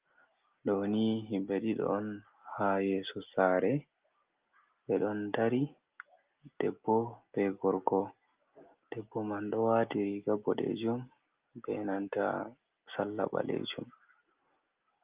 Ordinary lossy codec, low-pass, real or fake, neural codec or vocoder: Opus, 32 kbps; 3.6 kHz; real; none